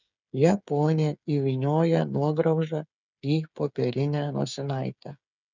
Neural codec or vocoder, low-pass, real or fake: codec, 16 kHz, 8 kbps, FreqCodec, smaller model; 7.2 kHz; fake